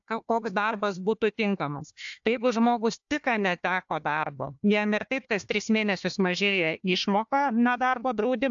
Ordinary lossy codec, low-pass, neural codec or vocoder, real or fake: AAC, 64 kbps; 7.2 kHz; codec, 16 kHz, 1 kbps, FunCodec, trained on Chinese and English, 50 frames a second; fake